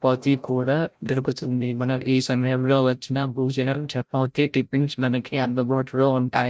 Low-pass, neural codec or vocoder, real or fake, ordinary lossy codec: none; codec, 16 kHz, 0.5 kbps, FreqCodec, larger model; fake; none